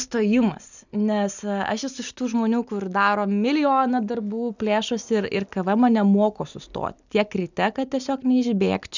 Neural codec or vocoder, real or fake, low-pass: none; real; 7.2 kHz